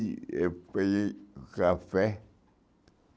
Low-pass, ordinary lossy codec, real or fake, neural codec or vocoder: none; none; real; none